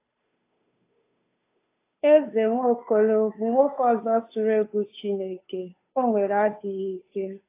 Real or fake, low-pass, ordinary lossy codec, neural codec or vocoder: fake; 3.6 kHz; AAC, 24 kbps; codec, 16 kHz, 2 kbps, FunCodec, trained on Chinese and English, 25 frames a second